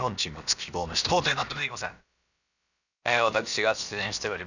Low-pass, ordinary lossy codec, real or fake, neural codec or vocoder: 7.2 kHz; none; fake; codec, 16 kHz, about 1 kbps, DyCAST, with the encoder's durations